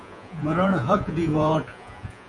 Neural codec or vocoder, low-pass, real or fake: vocoder, 48 kHz, 128 mel bands, Vocos; 10.8 kHz; fake